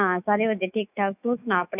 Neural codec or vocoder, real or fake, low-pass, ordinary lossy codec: autoencoder, 48 kHz, 128 numbers a frame, DAC-VAE, trained on Japanese speech; fake; 3.6 kHz; none